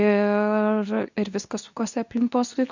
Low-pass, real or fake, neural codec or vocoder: 7.2 kHz; fake; codec, 24 kHz, 0.9 kbps, WavTokenizer, medium speech release version 2